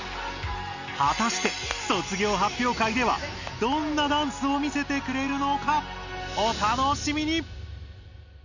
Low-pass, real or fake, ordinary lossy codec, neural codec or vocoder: 7.2 kHz; real; none; none